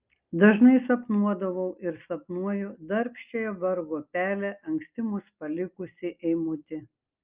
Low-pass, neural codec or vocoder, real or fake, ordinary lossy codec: 3.6 kHz; none; real; Opus, 24 kbps